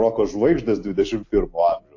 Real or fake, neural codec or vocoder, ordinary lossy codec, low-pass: real; none; AAC, 48 kbps; 7.2 kHz